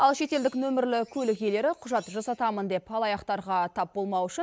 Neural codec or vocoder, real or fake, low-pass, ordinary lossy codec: none; real; none; none